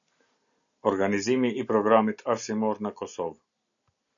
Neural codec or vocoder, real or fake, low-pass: none; real; 7.2 kHz